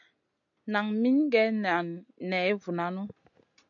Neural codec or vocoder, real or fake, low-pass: none; real; 7.2 kHz